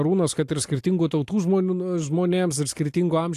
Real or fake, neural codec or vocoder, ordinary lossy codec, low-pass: real; none; AAC, 64 kbps; 14.4 kHz